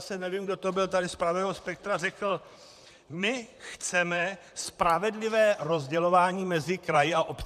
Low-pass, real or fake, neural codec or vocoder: 14.4 kHz; fake; vocoder, 44.1 kHz, 128 mel bands, Pupu-Vocoder